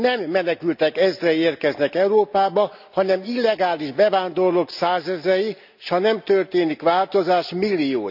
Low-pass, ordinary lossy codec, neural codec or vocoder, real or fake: 5.4 kHz; none; none; real